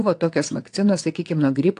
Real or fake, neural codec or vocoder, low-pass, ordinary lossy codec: fake; vocoder, 22.05 kHz, 80 mel bands, WaveNeXt; 9.9 kHz; AAC, 48 kbps